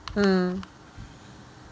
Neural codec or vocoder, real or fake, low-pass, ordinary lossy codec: none; real; none; none